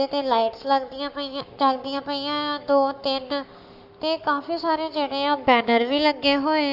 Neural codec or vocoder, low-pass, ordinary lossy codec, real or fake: codec, 44.1 kHz, 7.8 kbps, Pupu-Codec; 5.4 kHz; none; fake